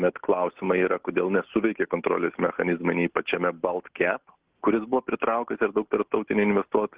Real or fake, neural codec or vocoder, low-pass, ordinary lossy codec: real; none; 3.6 kHz; Opus, 16 kbps